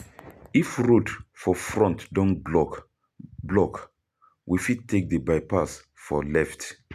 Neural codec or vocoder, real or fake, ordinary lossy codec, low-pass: none; real; none; 14.4 kHz